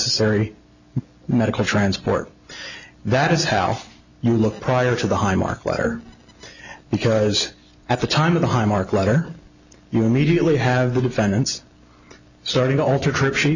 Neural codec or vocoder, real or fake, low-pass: none; real; 7.2 kHz